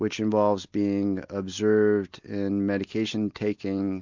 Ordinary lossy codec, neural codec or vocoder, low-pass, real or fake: MP3, 64 kbps; none; 7.2 kHz; real